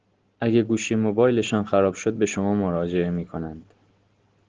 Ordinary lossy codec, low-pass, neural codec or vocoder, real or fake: Opus, 16 kbps; 7.2 kHz; none; real